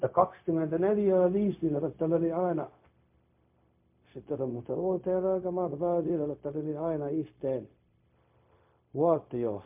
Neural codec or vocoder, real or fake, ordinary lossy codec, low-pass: codec, 16 kHz, 0.4 kbps, LongCat-Audio-Codec; fake; MP3, 32 kbps; 3.6 kHz